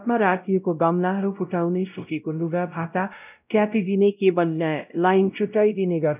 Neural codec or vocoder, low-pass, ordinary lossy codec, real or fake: codec, 16 kHz, 0.5 kbps, X-Codec, WavLM features, trained on Multilingual LibriSpeech; 3.6 kHz; none; fake